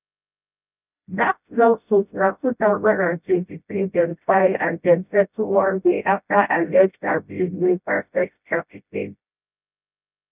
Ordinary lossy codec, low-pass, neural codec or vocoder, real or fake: none; 3.6 kHz; codec, 16 kHz, 0.5 kbps, FreqCodec, smaller model; fake